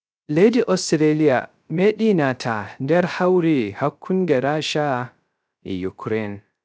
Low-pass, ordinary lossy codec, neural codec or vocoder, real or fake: none; none; codec, 16 kHz, 0.3 kbps, FocalCodec; fake